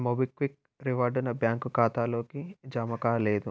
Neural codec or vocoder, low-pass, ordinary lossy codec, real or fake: none; none; none; real